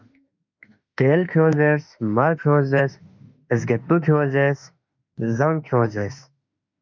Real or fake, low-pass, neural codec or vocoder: fake; 7.2 kHz; autoencoder, 48 kHz, 32 numbers a frame, DAC-VAE, trained on Japanese speech